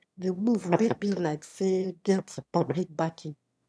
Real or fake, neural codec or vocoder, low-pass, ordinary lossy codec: fake; autoencoder, 22.05 kHz, a latent of 192 numbers a frame, VITS, trained on one speaker; none; none